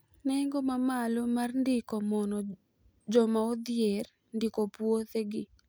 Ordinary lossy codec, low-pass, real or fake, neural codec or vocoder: none; none; real; none